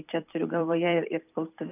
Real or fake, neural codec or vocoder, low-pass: fake; vocoder, 44.1 kHz, 128 mel bands every 256 samples, BigVGAN v2; 3.6 kHz